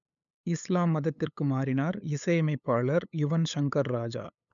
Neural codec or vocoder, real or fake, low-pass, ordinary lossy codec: codec, 16 kHz, 8 kbps, FunCodec, trained on LibriTTS, 25 frames a second; fake; 7.2 kHz; none